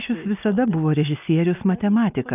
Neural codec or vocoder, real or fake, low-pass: none; real; 3.6 kHz